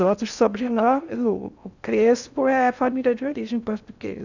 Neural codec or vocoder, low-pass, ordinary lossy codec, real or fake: codec, 16 kHz in and 24 kHz out, 0.6 kbps, FocalCodec, streaming, 4096 codes; 7.2 kHz; none; fake